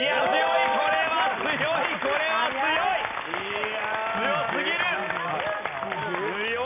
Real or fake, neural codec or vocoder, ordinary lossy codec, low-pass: real; none; none; 3.6 kHz